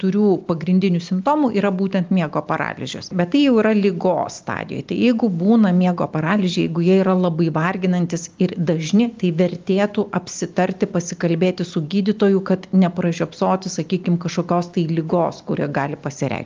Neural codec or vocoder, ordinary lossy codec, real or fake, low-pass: none; Opus, 24 kbps; real; 7.2 kHz